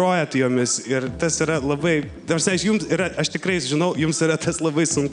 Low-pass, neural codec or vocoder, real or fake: 9.9 kHz; none; real